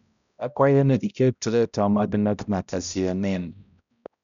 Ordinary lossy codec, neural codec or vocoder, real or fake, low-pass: none; codec, 16 kHz, 0.5 kbps, X-Codec, HuBERT features, trained on balanced general audio; fake; 7.2 kHz